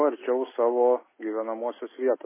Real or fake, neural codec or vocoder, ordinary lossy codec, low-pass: real; none; MP3, 16 kbps; 3.6 kHz